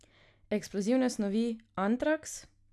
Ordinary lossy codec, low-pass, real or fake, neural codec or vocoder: none; none; real; none